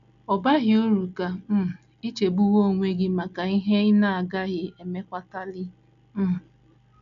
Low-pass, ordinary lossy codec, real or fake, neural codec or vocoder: 7.2 kHz; none; real; none